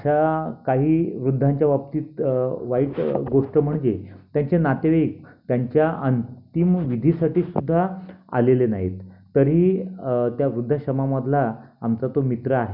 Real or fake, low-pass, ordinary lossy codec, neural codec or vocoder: real; 5.4 kHz; none; none